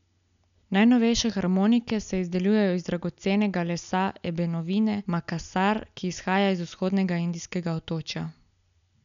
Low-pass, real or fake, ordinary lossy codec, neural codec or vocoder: 7.2 kHz; real; none; none